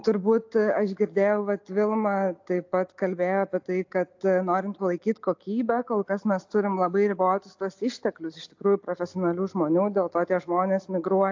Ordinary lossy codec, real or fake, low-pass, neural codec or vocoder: AAC, 48 kbps; real; 7.2 kHz; none